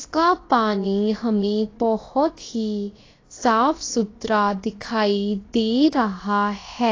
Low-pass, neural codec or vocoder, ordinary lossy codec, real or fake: 7.2 kHz; codec, 16 kHz, about 1 kbps, DyCAST, with the encoder's durations; AAC, 32 kbps; fake